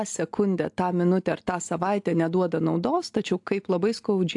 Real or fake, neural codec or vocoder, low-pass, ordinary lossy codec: real; none; 10.8 kHz; AAC, 64 kbps